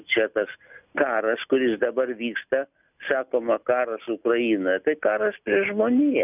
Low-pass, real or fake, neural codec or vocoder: 3.6 kHz; real; none